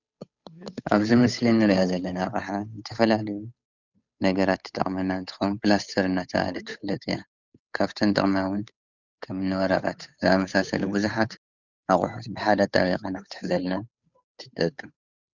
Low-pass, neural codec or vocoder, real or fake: 7.2 kHz; codec, 16 kHz, 8 kbps, FunCodec, trained on Chinese and English, 25 frames a second; fake